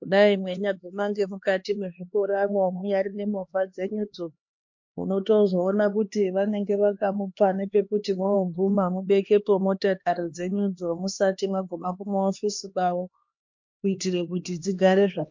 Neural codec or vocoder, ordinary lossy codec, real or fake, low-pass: codec, 16 kHz, 2 kbps, X-Codec, HuBERT features, trained on LibriSpeech; MP3, 48 kbps; fake; 7.2 kHz